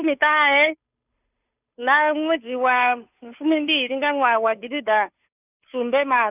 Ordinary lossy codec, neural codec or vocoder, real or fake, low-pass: none; codec, 16 kHz, 2 kbps, FunCodec, trained on Chinese and English, 25 frames a second; fake; 3.6 kHz